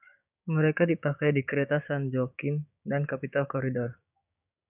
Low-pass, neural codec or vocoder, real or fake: 3.6 kHz; codec, 16 kHz, 6 kbps, DAC; fake